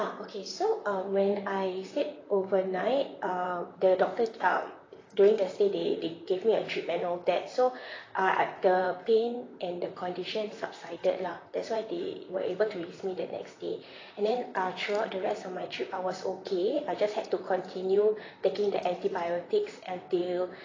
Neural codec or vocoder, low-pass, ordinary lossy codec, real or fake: vocoder, 44.1 kHz, 128 mel bands, Pupu-Vocoder; 7.2 kHz; AAC, 32 kbps; fake